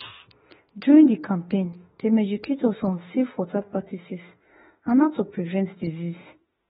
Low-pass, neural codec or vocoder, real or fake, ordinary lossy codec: 19.8 kHz; autoencoder, 48 kHz, 32 numbers a frame, DAC-VAE, trained on Japanese speech; fake; AAC, 16 kbps